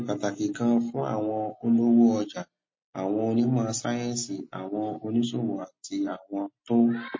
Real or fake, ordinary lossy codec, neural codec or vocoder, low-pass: real; MP3, 32 kbps; none; 7.2 kHz